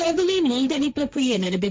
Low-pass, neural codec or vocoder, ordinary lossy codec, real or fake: none; codec, 16 kHz, 1.1 kbps, Voila-Tokenizer; none; fake